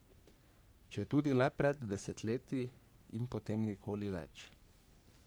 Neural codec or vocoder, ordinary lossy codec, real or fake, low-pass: codec, 44.1 kHz, 3.4 kbps, Pupu-Codec; none; fake; none